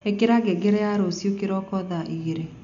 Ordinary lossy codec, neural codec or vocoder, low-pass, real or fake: none; none; 7.2 kHz; real